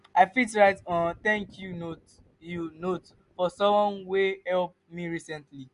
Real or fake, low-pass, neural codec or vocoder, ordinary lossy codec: real; 10.8 kHz; none; MP3, 64 kbps